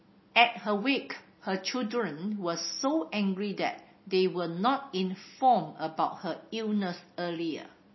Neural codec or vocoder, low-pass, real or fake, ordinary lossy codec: none; 7.2 kHz; real; MP3, 24 kbps